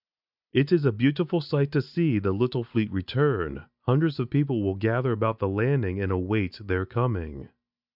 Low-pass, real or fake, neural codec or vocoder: 5.4 kHz; real; none